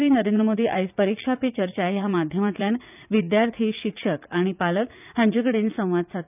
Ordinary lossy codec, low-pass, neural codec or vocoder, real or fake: none; 3.6 kHz; vocoder, 44.1 kHz, 80 mel bands, Vocos; fake